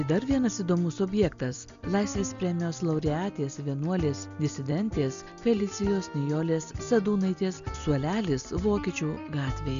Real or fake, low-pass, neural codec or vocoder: real; 7.2 kHz; none